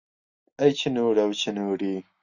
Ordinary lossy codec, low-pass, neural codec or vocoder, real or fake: Opus, 64 kbps; 7.2 kHz; none; real